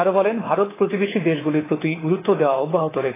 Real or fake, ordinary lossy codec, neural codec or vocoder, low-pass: fake; AAC, 16 kbps; codec, 24 kHz, 3.1 kbps, DualCodec; 3.6 kHz